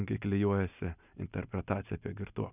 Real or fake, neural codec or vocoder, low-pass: real; none; 3.6 kHz